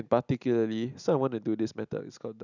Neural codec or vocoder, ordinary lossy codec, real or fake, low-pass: none; none; real; 7.2 kHz